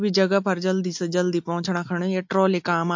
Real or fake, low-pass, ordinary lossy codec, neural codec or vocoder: real; 7.2 kHz; MP3, 48 kbps; none